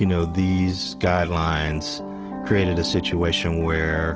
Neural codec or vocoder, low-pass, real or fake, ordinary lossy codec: none; 7.2 kHz; real; Opus, 16 kbps